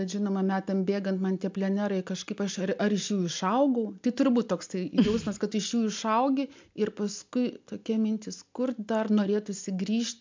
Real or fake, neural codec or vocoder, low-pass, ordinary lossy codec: real; none; 7.2 kHz; MP3, 64 kbps